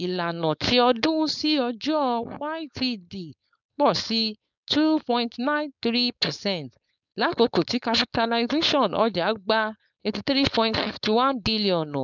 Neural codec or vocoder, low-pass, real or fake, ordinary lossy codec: codec, 16 kHz, 4.8 kbps, FACodec; 7.2 kHz; fake; none